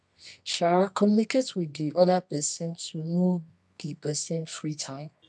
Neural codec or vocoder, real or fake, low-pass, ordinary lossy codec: codec, 24 kHz, 0.9 kbps, WavTokenizer, medium music audio release; fake; none; none